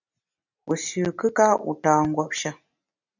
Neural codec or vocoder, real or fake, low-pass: none; real; 7.2 kHz